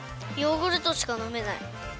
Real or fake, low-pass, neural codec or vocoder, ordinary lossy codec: real; none; none; none